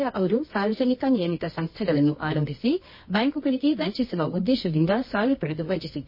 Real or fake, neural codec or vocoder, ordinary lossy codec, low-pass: fake; codec, 24 kHz, 0.9 kbps, WavTokenizer, medium music audio release; MP3, 24 kbps; 5.4 kHz